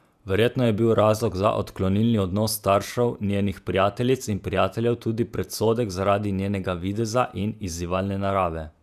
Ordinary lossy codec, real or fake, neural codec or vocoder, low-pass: none; fake; vocoder, 48 kHz, 128 mel bands, Vocos; 14.4 kHz